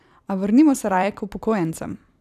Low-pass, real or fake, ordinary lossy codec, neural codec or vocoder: 14.4 kHz; fake; none; vocoder, 44.1 kHz, 128 mel bands every 256 samples, BigVGAN v2